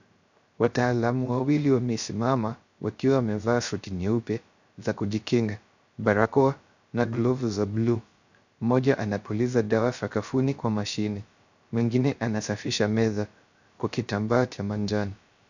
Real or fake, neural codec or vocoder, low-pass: fake; codec, 16 kHz, 0.3 kbps, FocalCodec; 7.2 kHz